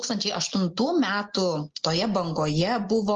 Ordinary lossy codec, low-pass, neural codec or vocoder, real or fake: Opus, 24 kbps; 7.2 kHz; none; real